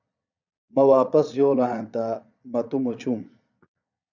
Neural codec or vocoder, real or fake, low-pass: vocoder, 22.05 kHz, 80 mel bands, Vocos; fake; 7.2 kHz